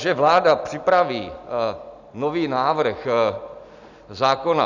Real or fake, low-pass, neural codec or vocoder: real; 7.2 kHz; none